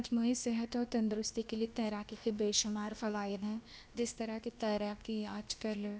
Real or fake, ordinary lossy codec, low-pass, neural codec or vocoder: fake; none; none; codec, 16 kHz, about 1 kbps, DyCAST, with the encoder's durations